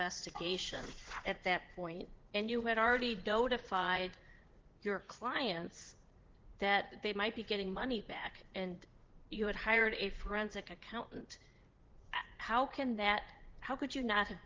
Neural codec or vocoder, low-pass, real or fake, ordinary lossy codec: vocoder, 44.1 kHz, 80 mel bands, Vocos; 7.2 kHz; fake; Opus, 16 kbps